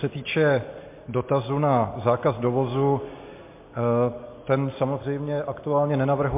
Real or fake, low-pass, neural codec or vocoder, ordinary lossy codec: real; 3.6 kHz; none; MP3, 24 kbps